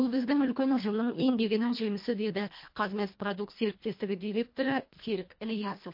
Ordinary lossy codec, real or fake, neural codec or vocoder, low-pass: MP3, 32 kbps; fake; codec, 24 kHz, 1.5 kbps, HILCodec; 5.4 kHz